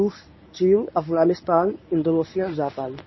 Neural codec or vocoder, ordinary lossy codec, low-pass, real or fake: codec, 24 kHz, 0.9 kbps, WavTokenizer, medium speech release version 1; MP3, 24 kbps; 7.2 kHz; fake